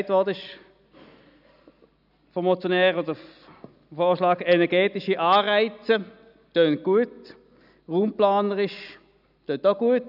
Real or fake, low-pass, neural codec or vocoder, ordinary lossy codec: real; 5.4 kHz; none; none